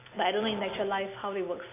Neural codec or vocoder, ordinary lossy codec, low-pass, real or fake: none; AAC, 24 kbps; 3.6 kHz; real